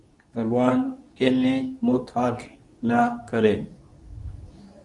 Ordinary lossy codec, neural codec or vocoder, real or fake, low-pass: Opus, 64 kbps; codec, 24 kHz, 0.9 kbps, WavTokenizer, medium speech release version 2; fake; 10.8 kHz